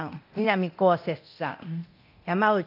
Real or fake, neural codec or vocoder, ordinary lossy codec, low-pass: fake; codec, 24 kHz, 0.9 kbps, DualCodec; AAC, 48 kbps; 5.4 kHz